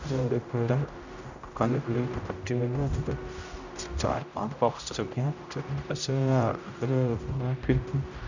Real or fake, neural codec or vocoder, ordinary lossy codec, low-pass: fake; codec, 16 kHz, 0.5 kbps, X-Codec, HuBERT features, trained on general audio; none; 7.2 kHz